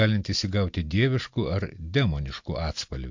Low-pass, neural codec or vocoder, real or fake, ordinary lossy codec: 7.2 kHz; none; real; MP3, 48 kbps